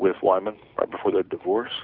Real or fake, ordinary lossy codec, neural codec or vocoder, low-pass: real; Opus, 64 kbps; none; 5.4 kHz